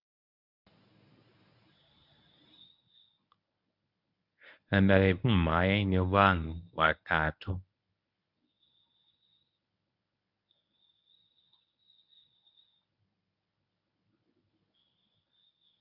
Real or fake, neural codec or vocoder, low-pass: fake; codec, 24 kHz, 0.9 kbps, WavTokenizer, medium speech release version 1; 5.4 kHz